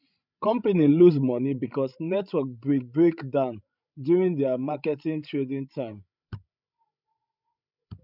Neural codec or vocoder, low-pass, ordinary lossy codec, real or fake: codec, 16 kHz, 16 kbps, FreqCodec, larger model; 5.4 kHz; none; fake